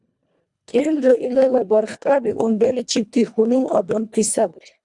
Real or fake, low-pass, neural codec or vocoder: fake; 10.8 kHz; codec, 24 kHz, 1.5 kbps, HILCodec